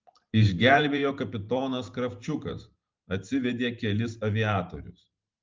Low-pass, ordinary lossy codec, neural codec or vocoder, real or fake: 7.2 kHz; Opus, 32 kbps; vocoder, 44.1 kHz, 128 mel bands every 512 samples, BigVGAN v2; fake